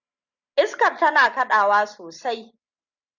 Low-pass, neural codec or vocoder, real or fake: 7.2 kHz; none; real